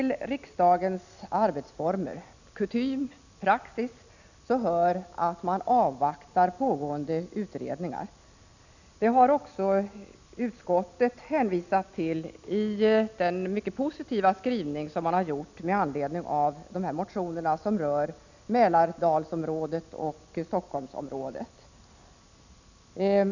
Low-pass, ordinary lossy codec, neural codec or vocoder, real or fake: 7.2 kHz; none; none; real